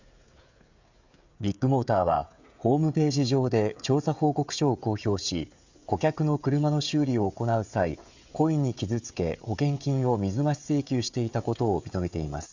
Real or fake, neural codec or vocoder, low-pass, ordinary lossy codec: fake; codec, 16 kHz, 16 kbps, FreqCodec, smaller model; 7.2 kHz; Opus, 64 kbps